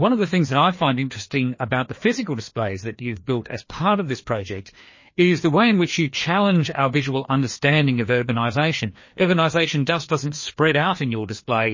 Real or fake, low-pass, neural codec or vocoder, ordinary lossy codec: fake; 7.2 kHz; codec, 16 kHz, 2 kbps, FreqCodec, larger model; MP3, 32 kbps